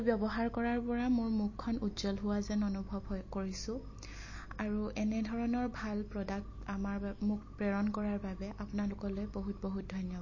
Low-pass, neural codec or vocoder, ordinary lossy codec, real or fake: 7.2 kHz; none; MP3, 32 kbps; real